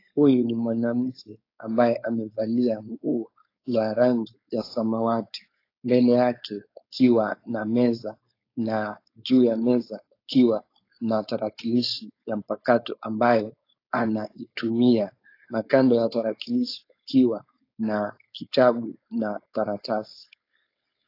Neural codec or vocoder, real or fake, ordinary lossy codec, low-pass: codec, 16 kHz, 4.8 kbps, FACodec; fake; AAC, 32 kbps; 5.4 kHz